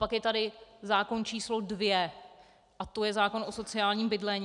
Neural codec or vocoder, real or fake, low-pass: none; real; 10.8 kHz